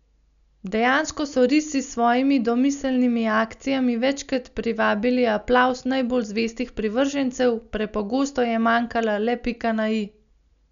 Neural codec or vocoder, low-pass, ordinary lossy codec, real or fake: none; 7.2 kHz; none; real